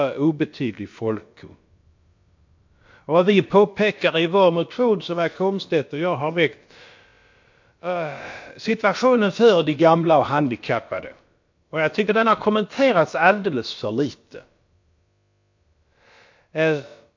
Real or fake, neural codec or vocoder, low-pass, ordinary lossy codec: fake; codec, 16 kHz, about 1 kbps, DyCAST, with the encoder's durations; 7.2 kHz; MP3, 48 kbps